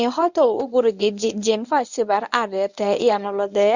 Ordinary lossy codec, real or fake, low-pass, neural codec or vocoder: none; fake; 7.2 kHz; codec, 24 kHz, 0.9 kbps, WavTokenizer, medium speech release version 1